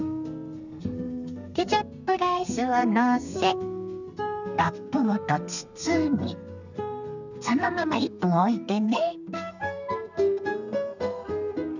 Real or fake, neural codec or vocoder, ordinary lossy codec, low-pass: fake; codec, 32 kHz, 1.9 kbps, SNAC; none; 7.2 kHz